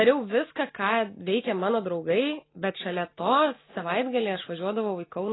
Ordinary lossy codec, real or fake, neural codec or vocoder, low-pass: AAC, 16 kbps; real; none; 7.2 kHz